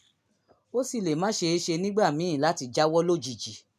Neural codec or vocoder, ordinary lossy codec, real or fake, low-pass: none; none; real; 14.4 kHz